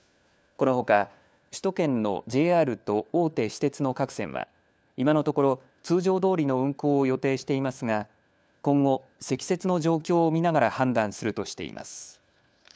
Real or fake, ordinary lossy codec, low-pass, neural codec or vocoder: fake; none; none; codec, 16 kHz, 4 kbps, FunCodec, trained on LibriTTS, 50 frames a second